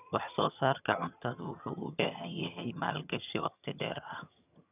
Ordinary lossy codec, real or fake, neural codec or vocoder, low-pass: none; fake; vocoder, 22.05 kHz, 80 mel bands, HiFi-GAN; 3.6 kHz